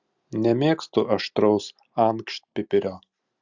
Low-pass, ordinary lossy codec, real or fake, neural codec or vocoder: 7.2 kHz; Opus, 64 kbps; real; none